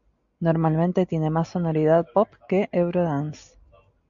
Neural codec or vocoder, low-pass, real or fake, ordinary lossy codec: none; 7.2 kHz; real; MP3, 96 kbps